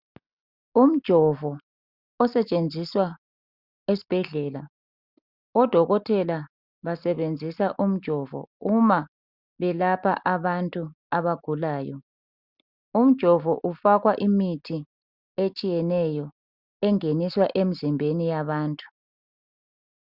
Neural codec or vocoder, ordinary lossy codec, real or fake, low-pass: none; Opus, 64 kbps; real; 5.4 kHz